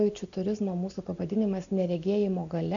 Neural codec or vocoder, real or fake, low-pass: none; real; 7.2 kHz